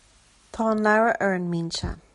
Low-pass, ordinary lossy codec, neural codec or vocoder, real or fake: 14.4 kHz; MP3, 48 kbps; none; real